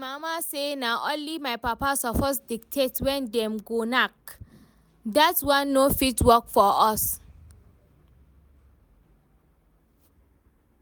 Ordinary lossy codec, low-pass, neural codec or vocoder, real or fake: none; none; none; real